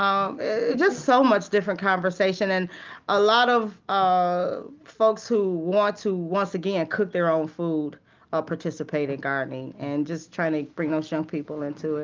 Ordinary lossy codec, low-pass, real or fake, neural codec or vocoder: Opus, 24 kbps; 7.2 kHz; real; none